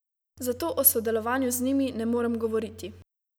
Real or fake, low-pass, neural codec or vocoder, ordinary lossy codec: real; none; none; none